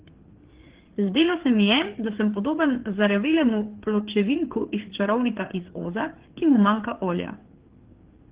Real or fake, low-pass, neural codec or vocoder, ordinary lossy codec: fake; 3.6 kHz; codec, 16 kHz, 4 kbps, FreqCodec, larger model; Opus, 16 kbps